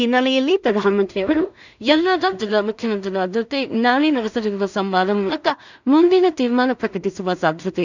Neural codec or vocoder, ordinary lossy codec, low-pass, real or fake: codec, 16 kHz in and 24 kHz out, 0.4 kbps, LongCat-Audio-Codec, two codebook decoder; none; 7.2 kHz; fake